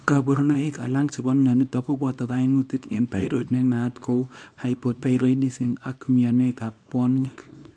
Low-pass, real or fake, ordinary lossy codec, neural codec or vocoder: 9.9 kHz; fake; MP3, 64 kbps; codec, 24 kHz, 0.9 kbps, WavTokenizer, small release